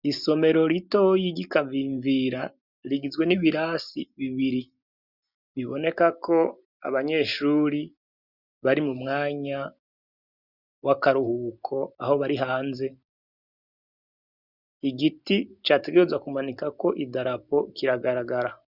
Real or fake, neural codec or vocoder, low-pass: real; none; 5.4 kHz